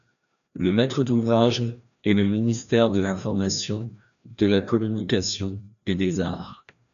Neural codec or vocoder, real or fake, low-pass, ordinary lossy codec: codec, 16 kHz, 1 kbps, FreqCodec, larger model; fake; 7.2 kHz; MP3, 96 kbps